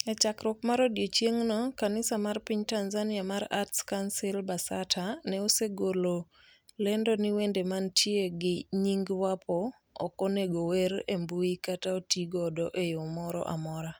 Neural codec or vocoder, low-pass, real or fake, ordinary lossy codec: none; none; real; none